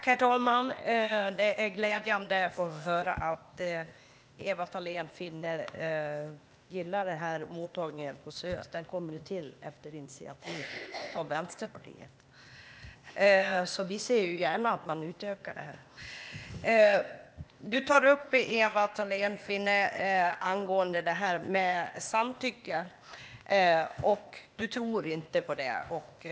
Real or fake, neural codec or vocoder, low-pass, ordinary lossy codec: fake; codec, 16 kHz, 0.8 kbps, ZipCodec; none; none